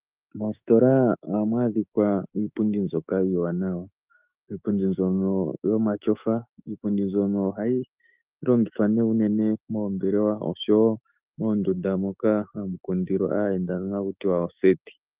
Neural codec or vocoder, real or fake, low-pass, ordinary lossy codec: autoencoder, 48 kHz, 128 numbers a frame, DAC-VAE, trained on Japanese speech; fake; 3.6 kHz; Opus, 32 kbps